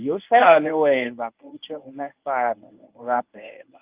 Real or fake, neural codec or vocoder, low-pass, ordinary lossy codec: fake; codec, 24 kHz, 0.9 kbps, WavTokenizer, medium speech release version 1; 3.6 kHz; Opus, 24 kbps